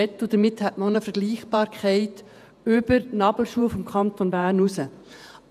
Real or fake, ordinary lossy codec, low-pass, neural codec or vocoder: fake; none; 14.4 kHz; vocoder, 48 kHz, 128 mel bands, Vocos